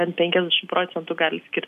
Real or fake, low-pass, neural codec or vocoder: real; 14.4 kHz; none